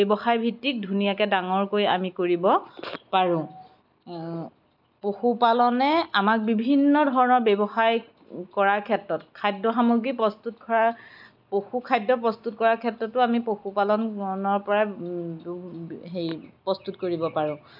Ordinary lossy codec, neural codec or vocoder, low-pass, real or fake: none; none; 5.4 kHz; real